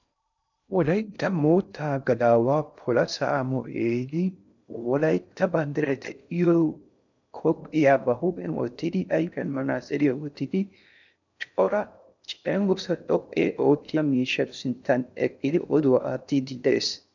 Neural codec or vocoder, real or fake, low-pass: codec, 16 kHz in and 24 kHz out, 0.6 kbps, FocalCodec, streaming, 2048 codes; fake; 7.2 kHz